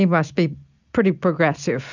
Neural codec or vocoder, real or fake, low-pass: none; real; 7.2 kHz